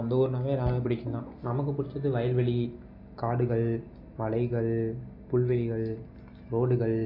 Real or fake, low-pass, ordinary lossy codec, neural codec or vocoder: real; 5.4 kHz; AAC, 32 kbps; none